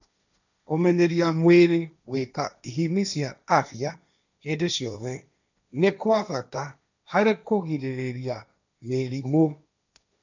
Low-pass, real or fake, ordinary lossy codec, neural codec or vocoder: 7.2 kHz; fake; none; codec, 16 kHz, 1.1 kbps, Voila-Tokenizer